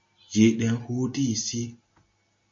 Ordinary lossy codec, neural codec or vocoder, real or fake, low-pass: MP3, 48 kbps; none; real; 7.2 kHz